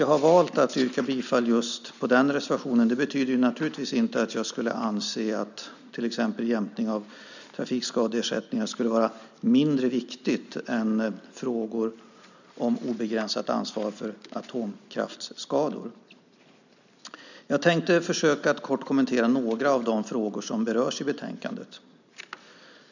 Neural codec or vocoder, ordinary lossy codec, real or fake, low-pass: none; none; real; 7.2 kHz